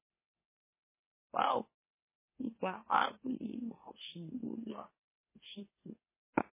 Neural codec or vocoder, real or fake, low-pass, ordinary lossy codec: autoencoder, 44.1 kHz, a latent of 192 numbers a frame, MeloTTS; fake; 3.6 kHz; MP3, 16 kbps